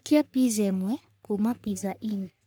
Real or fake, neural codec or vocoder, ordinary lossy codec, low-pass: fake; codec, 44.1 kHz, 3.4 kbps, Pupu-Codec; none; none